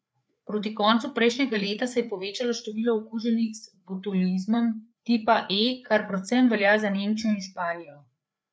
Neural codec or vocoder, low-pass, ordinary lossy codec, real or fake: codec, 16 kHz, 4 kbps, FreqCodec, larger model; none; none; fake